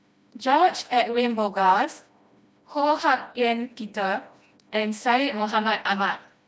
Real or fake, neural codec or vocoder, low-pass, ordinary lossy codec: fake; codec, 16 kHz, 1 kbps, FreqCodec, smaller model; none; none